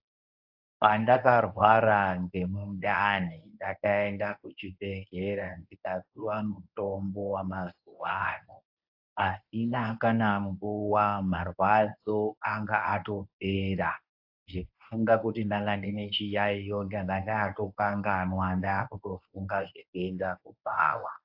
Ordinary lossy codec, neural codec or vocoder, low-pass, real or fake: AAC, 48 kbps; codec, 24 kHz, 0.9 kbps, WavTokenizer, medium speech release version 1; 5.4 kHz; fake